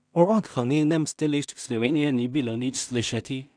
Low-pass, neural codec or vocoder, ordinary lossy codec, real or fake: 9.9 kHz; codec, 16 kHz in and 24 kHz out, 0.4 kbps, LongCat-Audio-Codec, two codebook decoder; none; fake